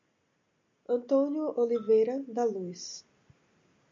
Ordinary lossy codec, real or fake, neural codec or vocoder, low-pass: AAC, 64 kbps; real; none; 7.2 kHz